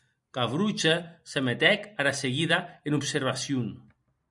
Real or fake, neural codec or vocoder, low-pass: fake; vocoder, 44.1 kHz, 128 mel bands every 256 samples, BigVGAN v2; 10.8 kHz